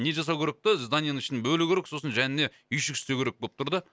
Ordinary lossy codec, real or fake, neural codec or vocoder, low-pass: none; real; none; none